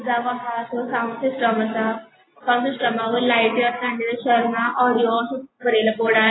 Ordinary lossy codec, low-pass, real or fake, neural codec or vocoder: AAC, 16 kbps; 7.2 kHz; real; none